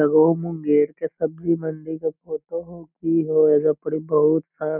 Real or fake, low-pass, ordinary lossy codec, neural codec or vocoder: real; 3.6 kHz; none; none